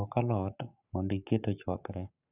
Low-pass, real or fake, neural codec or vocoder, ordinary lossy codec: 3.6 kHz; fake; codec, 44.1 kHz, 7.8 kbps, Pupu-Codec; none